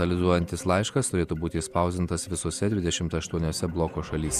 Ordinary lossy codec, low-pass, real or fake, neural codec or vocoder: Opus, 64 kbps; 14.4 kHz; fake; vocoder, 48 kHz, 128 mel bands, Vocos